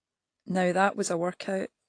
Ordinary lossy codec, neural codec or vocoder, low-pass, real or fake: AAC, 48 kbps; none; 9.9 kHz; real